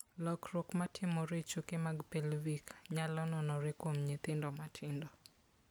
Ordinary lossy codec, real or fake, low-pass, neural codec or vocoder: none; real; none; none